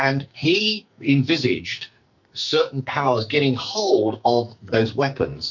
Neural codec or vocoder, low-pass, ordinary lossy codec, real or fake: codec, 44.1 kHz, 2.6 kbps, SNAC; 7.2 kHz; MP3, 64 kbps; fake